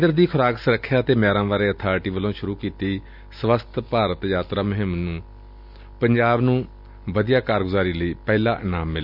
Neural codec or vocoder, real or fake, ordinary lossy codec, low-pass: none; real; none; 5.4 kHz